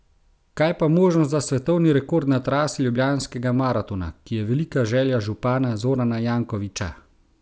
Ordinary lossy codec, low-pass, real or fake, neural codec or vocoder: none; none; real; none